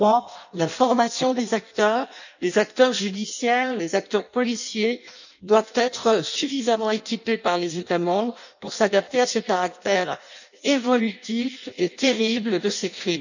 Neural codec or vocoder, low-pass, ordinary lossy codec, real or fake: codec, 16 kHz in and 24 kHz out, 0.6 kbps, FireRedTTS-2 codec; 7.2 kHz; none; fake